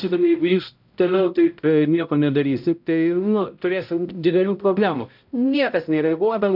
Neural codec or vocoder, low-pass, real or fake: codec, 16 kHz, 0.5 kbps, X-Codec, HuBERT features, trained on balanced general audio; 5.4 kHz; fake